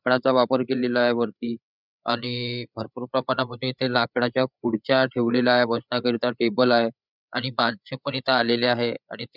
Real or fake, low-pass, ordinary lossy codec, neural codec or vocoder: fake; 5.4 kHz; none; codec, 16 kHz, 8 kbps, FreqCodec, larger model